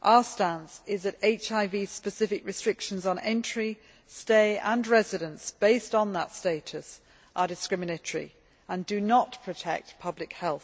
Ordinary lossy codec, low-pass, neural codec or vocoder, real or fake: none; none; none; real